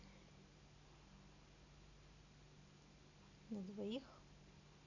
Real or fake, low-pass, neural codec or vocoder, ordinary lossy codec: real; 7.2 kHz; none; none